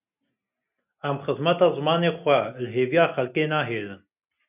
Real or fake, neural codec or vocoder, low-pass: real; none; 3.6 kHz